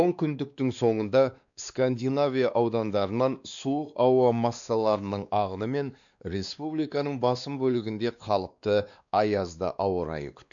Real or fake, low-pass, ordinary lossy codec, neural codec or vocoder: fake; 7.2 kHz; none; codec, 16 kHz, 2 kbps, X-Codec, WavLM features, trained on Multilingual LibriSpeech